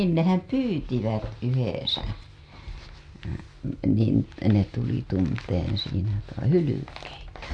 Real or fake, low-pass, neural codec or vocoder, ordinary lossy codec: real; none; none; none